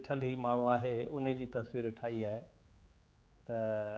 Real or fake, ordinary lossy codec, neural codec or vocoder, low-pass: fake; none; codec, 16 kHz, 4 kbps, X-Codec, HuBERT features, trained on general audio; none